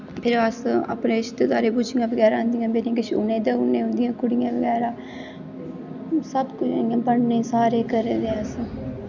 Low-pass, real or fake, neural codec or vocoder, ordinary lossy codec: 7.2 kHz; real; none; none